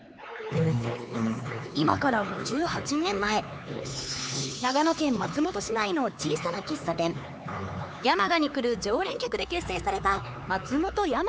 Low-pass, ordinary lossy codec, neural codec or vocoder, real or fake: none; none; codec, 16 kHz, 4 kbps, X-Codec, HuBERT features, trained on LibriSpeech; fake